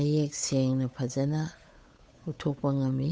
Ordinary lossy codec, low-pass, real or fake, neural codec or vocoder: none; none; fake; codec, 16 kHz, 8 kbps, FunCodec, trained on Chinese and English, 25 frames a second